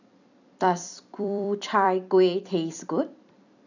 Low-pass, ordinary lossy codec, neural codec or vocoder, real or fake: 7.2 kHz; none; vocoder, 44.1 kHz, 128 mel bands every 256 samples, BigVGAN v2; fake